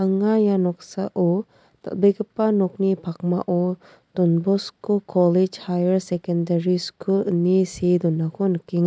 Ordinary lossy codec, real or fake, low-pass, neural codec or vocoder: none; real; none; none